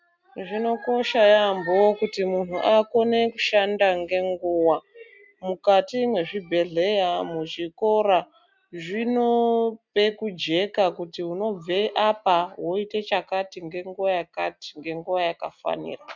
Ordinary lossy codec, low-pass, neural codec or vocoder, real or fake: MP3, 64 kbps; 7.2 kHz; none; real